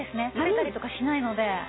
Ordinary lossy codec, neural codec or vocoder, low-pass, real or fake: AAC, 16 kbps; none; 7.2 kHz; real